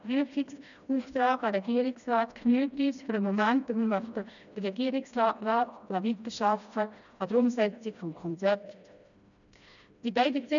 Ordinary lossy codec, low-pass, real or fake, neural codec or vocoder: none; 7.2 kHz; fake; codec, 16 kHz, 1 kbps, FreqCodec, smaller model